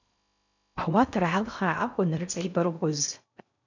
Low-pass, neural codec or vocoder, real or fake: 7.2 kHz; codec, 16 kHz in and 24 kHz out, 0.6 kbps, FocalCodec, streaming, 2048 codes; fake